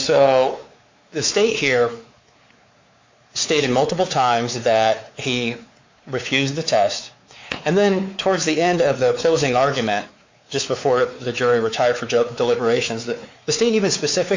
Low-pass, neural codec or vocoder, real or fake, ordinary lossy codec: 7.2 kHz; codec, 16 kHz, 4 kbps, X-Codec, WavLM features, trained on Multilingual LibriSpeech; fake; MP3, 48 kbps